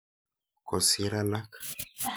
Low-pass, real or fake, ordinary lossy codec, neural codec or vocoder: none; real; none; none